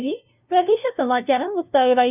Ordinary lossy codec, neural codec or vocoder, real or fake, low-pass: none; codec, 16 kHz, 0.5 kbps, FunCodec, trained on LibriTTS, 25 frames a second; fake; 3.6 kHz